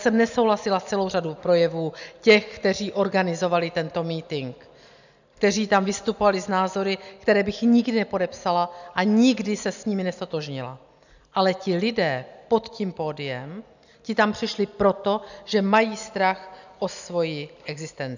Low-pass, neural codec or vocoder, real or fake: 7.2 kHz; none; real